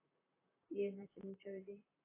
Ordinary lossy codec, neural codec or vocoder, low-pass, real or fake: AAC, 24 kbps; vocoder, 44.1 kHz, 128 mel bands, Pupu-Vocoder; 3.6 kHz; fake